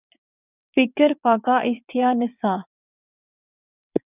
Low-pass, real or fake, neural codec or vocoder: 3.6 kHz; fake; vocoder, 22.05 kHz, 80 mel bands, WaveNeXt